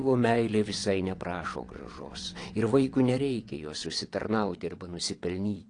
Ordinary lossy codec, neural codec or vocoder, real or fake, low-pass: AAC, 48 kbps; vocoder, 22.05 kHz, 80 mel bands, WaveNeXt; fake; 9.9 kHz